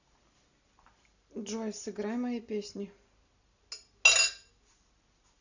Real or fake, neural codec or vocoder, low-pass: real; none; 7.2 kHz